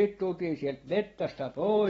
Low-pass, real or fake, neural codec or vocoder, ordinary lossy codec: 10.8 kHz; real; none; AAC, 24 kbps